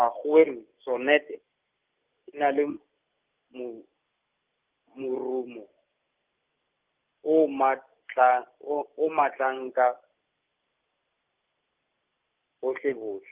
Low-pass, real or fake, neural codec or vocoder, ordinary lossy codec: 3.6 kHz; real; none; Opus, 24 kbps